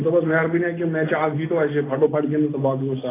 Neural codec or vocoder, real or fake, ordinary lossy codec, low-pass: none; real; AAC, 16 kbps; 3.6 kHz